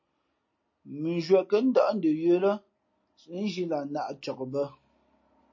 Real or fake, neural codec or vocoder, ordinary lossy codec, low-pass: real; none; MP3, 32 kbps; 7.2 kHz